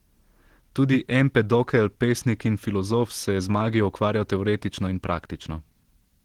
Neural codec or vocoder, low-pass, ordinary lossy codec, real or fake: vocoder, 44.1 kHz, 128 mel bands every 512 samples, BigVGAN v2; 19.8 kHz; Opus, 16 kbps; fake